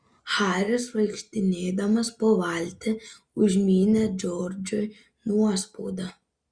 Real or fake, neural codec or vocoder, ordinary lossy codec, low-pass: real; none; Opus, 64 kbps; 9.9 kHz